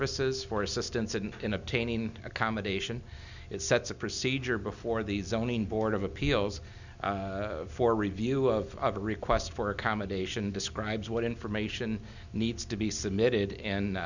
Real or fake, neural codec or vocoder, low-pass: real; none; 7.2 kHz